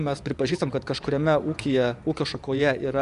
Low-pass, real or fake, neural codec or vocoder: 10.8 kHz; fake; vocoder, 24 kHz, 100 mel bands, Vocos